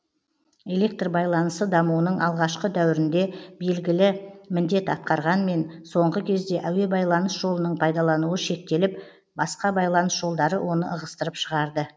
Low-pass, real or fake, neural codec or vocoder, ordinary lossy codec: none; real; none; none